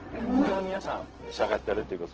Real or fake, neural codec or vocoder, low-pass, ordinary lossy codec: fake; codec, 16 kHz, 0.4 kbps, LongCat-Audio-Codec; 7.2 kHz; Opus, 16 kbps